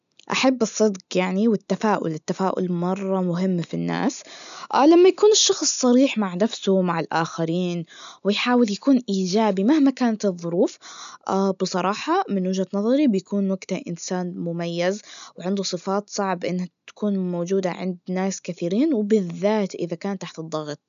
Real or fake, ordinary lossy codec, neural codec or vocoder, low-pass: real; none; none; 7.2 kHz